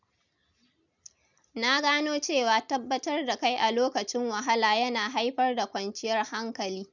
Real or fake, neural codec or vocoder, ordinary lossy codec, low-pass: real; none; none; 7.2 kHz